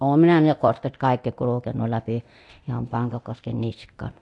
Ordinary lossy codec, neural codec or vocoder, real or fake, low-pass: AAC, 64 kbps; codec, 24 kHz, 0.9 kbps, DualCodec; fake; 10.8 kHz